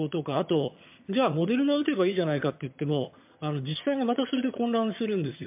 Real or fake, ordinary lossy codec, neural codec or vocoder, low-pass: fake; MP3, 32 kbps; vocoder, 22.05 kHz, 80 mel bands, HiFi-GAN; 3.6 kHz